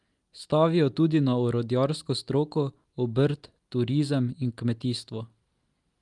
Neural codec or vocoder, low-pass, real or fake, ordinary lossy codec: none; 10.8 kHz; real; Opus, 32 kbps